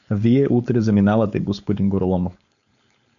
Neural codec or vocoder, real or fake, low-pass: codec, 16 kHz, 4.8 kbps, FACodec; fake; 7.2 kHz